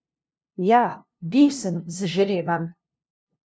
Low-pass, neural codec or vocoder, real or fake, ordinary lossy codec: none; codec, 16 kHz, 0.5 kbps, FunCodec, trained on LibriTTS, 25 frames a second; fake; none